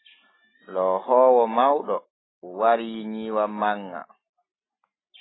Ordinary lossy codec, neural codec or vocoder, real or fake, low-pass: AAC, 16 kbps; none; real; 7.2 kHz